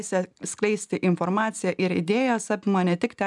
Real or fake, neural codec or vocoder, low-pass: real; none; 10.8 kHz